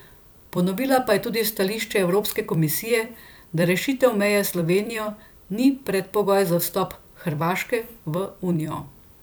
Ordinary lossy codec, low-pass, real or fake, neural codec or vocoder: none; none; fake; vocoder, 44.1 kHz, 128 mel bands every 256 samples, BigVGAN v2